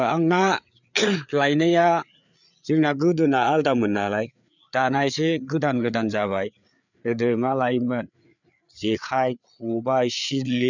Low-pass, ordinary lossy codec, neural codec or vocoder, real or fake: 7.2 kHz; none; codec, 16 kHz, 4 kbps, FreqCodec, larger model; fake